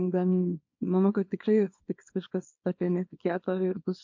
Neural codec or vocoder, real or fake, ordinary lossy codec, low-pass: codec, 24 kHz, 0.9 kbps, WavTokenizer, small release; fake; MP3, 48 kbps; 7.2 kHz